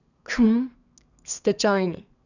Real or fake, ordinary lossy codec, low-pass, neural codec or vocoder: fake; none; 7.2 kHz; codec, 32 kHz, 1.9 kbps, SNAC